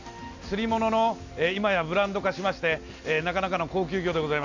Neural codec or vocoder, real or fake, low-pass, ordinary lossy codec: none; real; 7.2 kHz; Opus, 64 kbps